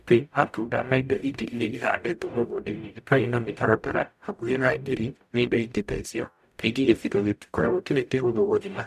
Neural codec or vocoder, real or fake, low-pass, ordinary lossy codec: codec, 44.1 kHz, 0.9 kbps, DAC; fake; 14.4 kHz; none